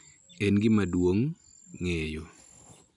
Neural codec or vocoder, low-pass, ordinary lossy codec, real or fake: none; 10.8 kHz; none; real